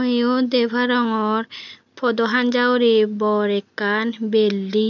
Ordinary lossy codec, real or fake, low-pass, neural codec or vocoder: none; real; 7.2 kHz; none